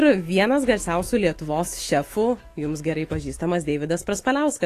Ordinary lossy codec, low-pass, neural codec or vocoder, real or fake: AAC, 48 kbps; 14.4 kHz; autoencoder, 48 kHz, 128 numbers a frame, DAC-VAE, trained on Japanese speech; fake